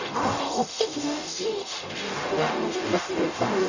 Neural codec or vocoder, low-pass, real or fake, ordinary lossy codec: codec, 44.1 kHz, 0.9 kbps, DAC; 7.2 kHz; fake; none